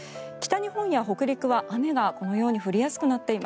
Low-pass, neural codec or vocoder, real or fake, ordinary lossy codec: none; none; real; none